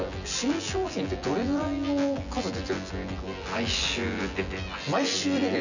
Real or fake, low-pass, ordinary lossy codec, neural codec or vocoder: fake; 7.2 kHz; none; vocoder, 24 kHz, 100 mel bands, Vocos